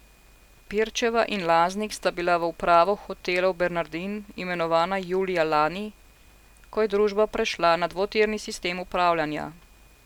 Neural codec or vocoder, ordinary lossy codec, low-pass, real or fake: none; none; 19.8 kHz; real